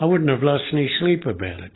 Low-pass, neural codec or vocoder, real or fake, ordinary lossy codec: 7.2 kHz; none; real; AAC, 16 kbps